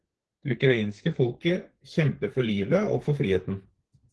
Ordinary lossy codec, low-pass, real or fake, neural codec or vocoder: Opus, 16 kbps; 10.8 kHz; fake; codec, 32 kHz, 1.9 kbps, SNAC